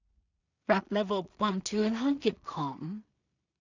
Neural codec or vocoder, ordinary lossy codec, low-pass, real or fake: codec, 16 kHz in and 24 kHz out, 0.4 kbps, LongCat-Audio-Codec, two codebook decoder; none; 7.2 kHz; fake